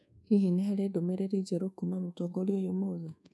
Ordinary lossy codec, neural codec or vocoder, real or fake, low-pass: none; codec, 24 kHz, 1.2 kbps, DualCodec; fake; none